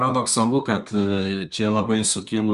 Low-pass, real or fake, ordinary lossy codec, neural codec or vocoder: 10.8 kHz; fake; Opus, 64 kbps; codec, 24 kHz, 1 kbps, SNAC